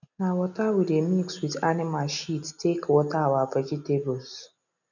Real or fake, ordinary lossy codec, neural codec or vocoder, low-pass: real; none; none; 7.2 kHz